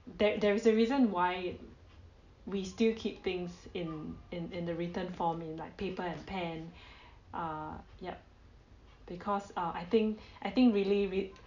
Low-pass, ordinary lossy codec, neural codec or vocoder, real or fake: 7.2 kHz; none; none; real